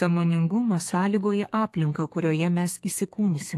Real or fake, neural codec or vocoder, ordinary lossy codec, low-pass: fake; codec, 32 kHz, 1.9 kbps, SNAC; AAC, 64 kbps; 14.4 kHz